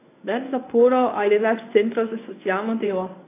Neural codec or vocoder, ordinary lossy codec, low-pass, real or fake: codec, 24 kHz, 0.9 kbps, WavTokenizer, medium speech release version 1; AAC, 24 kbps; 3.6 kHz; fake